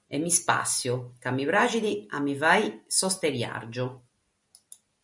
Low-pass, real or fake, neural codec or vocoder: 10.8 kHz; real; none